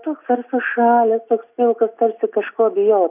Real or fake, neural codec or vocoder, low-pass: real; none; 3.6 kHz